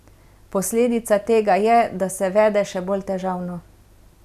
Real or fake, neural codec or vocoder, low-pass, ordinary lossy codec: real; none; 14.4 kHz; none